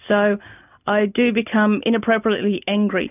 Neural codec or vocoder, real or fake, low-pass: none; real; 3.6 kHz